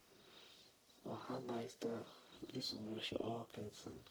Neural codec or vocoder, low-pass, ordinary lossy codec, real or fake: codec, 44.1 kHz, 1.7 kbps, Pupu-Codec; none; none; fake